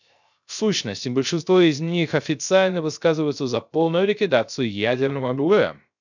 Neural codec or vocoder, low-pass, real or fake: codec, 16 kHz, 0.3 kbps, FocalCodec; 7.2 kHz; fake